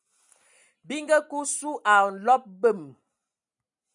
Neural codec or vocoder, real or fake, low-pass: none; real; 10.8 kHz